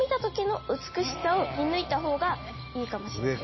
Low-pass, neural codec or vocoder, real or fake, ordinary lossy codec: 7.2 kHz; none; real; MP3, 24 kbps